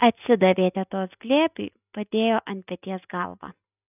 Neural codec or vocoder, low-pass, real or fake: none; 3.6 kHz; real